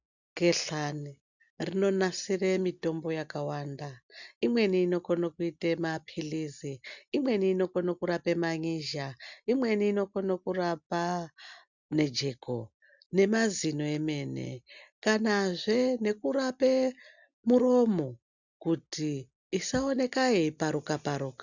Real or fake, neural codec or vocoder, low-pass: real; none; 7.2 kHz